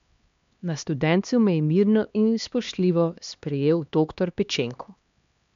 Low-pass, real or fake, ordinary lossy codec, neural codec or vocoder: 7.2 kHz; fake; MP3, 64 kbps; codec, 16 kHz, 2 kbps, X-Codec, HuBERT features, trained on LibriSpeech